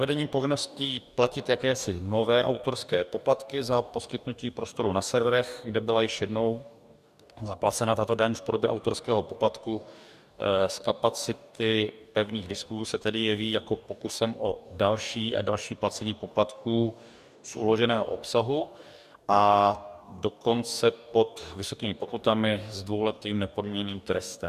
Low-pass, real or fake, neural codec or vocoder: 14.4 kHz; fake; codec, 44.1 kHz, 2.6 kbps, DAC